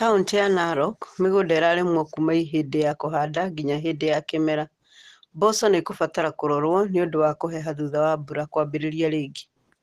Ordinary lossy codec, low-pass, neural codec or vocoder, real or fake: Opus, 16 kbps; 14.4 kHz; none; real